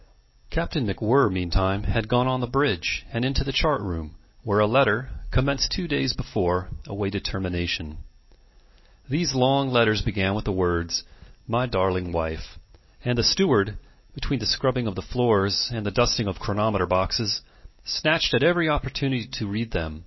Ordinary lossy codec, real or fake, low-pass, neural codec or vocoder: MP3, 24 kbps; real; 7.2 kHz; none